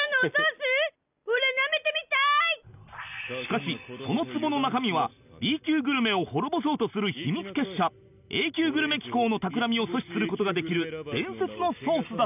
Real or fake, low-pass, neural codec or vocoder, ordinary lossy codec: real; 3.6 kHz; none; none